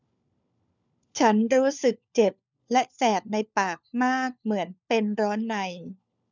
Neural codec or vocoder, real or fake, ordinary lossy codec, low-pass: codec, 16 kHz, 4 kbps, FunCodec, trained on LibriTTS, 50 frames a second; fake; none; 7.2 kHz